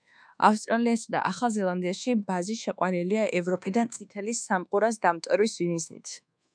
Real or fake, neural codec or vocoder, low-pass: fake; codec, 24 kHz, 1.2 kbps, DualCodec; 9.9 kHz